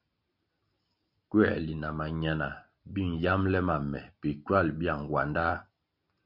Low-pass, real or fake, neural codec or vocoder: 5.4 kHz; real; none